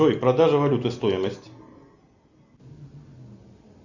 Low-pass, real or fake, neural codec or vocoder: 7.2 kHz; real; none